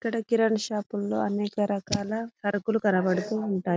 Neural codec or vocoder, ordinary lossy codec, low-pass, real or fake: none; none; none; real